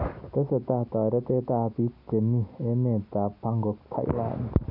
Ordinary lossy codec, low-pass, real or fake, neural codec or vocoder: none; 5.4 kHz; real; none